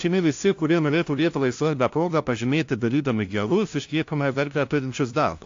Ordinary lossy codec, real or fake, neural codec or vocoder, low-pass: AAC, 48 kbps; fake; codec, 16 kHz, 0.5 kbps, FunCodec, trained on LibriTTS, 25 frames a second; 7.2 kHz